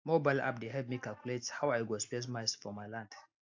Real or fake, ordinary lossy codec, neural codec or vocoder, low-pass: real; AAC, 48 kbps; none; 7.2 kHz